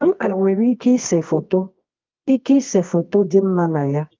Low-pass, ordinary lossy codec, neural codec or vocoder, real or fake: 7.2 kHz; Opus, 24 kbps; codec, 24 kHz, 0.9 kbps, WavTokenizer, medium music audio release; fake